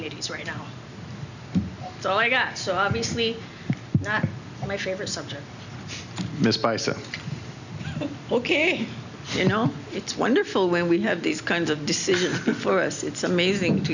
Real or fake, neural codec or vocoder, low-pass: real; none; 7.2 kHz